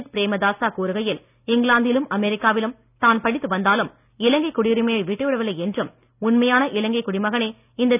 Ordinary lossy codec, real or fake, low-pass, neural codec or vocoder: none; real; 3.6 kHz; none